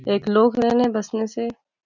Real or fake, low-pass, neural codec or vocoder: real; 7.2 kHz; none